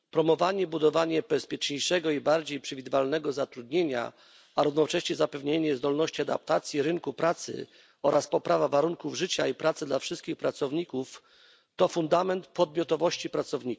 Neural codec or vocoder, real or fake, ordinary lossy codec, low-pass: none; real; none; none